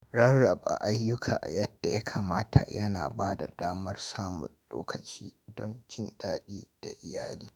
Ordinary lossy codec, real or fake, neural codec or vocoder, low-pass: none; fake; autoencoder, 48 kHz, 32 numbers a frame, DAC-VAE, trained on Japanese speech; none